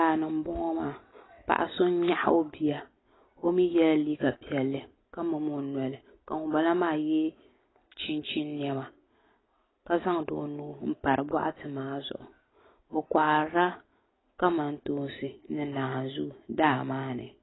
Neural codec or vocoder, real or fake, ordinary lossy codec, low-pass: none; real; AAC, 16 kbps; 7.2 kHz